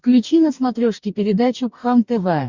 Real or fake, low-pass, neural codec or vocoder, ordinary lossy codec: fake; 7.2 kHz; codec, 44.1 kHz, 2.6 kbps, DAC; Opus, 64 kbps